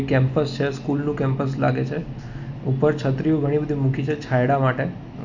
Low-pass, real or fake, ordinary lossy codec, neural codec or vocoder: 7.2 kHz; real; none; none